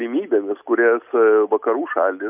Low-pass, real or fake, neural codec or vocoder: 3.6 kHz; real; none